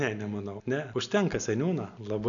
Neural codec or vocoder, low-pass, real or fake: none; 7.2 kHz; real